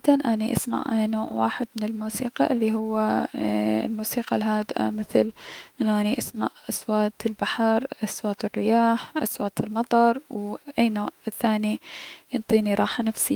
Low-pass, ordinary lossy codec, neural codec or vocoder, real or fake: 19.8 kHz; Opus, 32 kbps; autoencoder, 48 kHz, 32 numbers a frame, DAC-VAE, trained on Japanese speech; fake